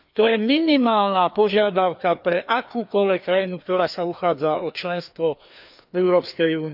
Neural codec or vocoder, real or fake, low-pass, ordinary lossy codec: codec, 16 kHz, 2 kbps, FreqCodec, larger model; fake; 5.4 kHz; none